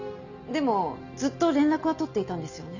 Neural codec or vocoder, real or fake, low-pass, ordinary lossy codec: none; real; 7.2 kHz; none